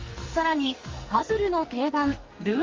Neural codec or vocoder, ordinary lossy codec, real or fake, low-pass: codec, 44.1 kHz, 2.6 kbps, SNAC; Opus, 32 kbps; fake; 7.2 kHz